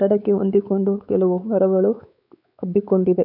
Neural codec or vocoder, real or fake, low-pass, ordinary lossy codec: codec, 16 kHz, 4 kbps, X-Codec, WavLM features, trained on Multilingual LibriSpeech; fake; 5.4 kHz; none